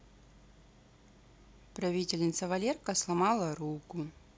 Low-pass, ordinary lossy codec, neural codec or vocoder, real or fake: none; none; none; real